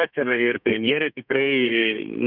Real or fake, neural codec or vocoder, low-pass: fake; codec, 32 kHz, 1.9 kbps, SNAC; 5.4 kHz